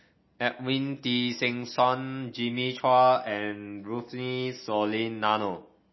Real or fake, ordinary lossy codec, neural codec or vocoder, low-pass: real; MP3, 24 kbps; none; 7.2 kHz